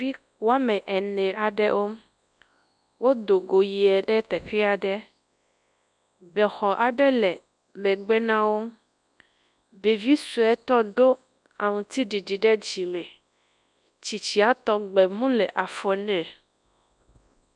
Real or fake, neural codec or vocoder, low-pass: fake; codec, 24 kHz, 0.9 kbps, WavTokenizer, large speech release; 10.8 kHz